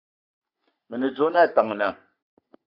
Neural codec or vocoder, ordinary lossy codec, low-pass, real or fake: codec, 44.1 kHz, 3.4 kbps, Pupu-Codec; Opus, 64 kbps; 5.4 kHz; fake